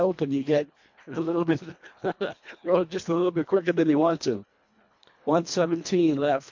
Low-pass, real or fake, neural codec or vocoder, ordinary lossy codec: 7.2 kHz; fake; codec, 24 kHz, 1.5 kbps, HILCodec; MP3, 48 kbps